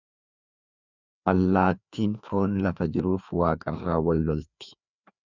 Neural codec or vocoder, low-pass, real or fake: codec, 16 kHz, 2 kbps, FreqCodec, larger model; 7.2 kHz; fake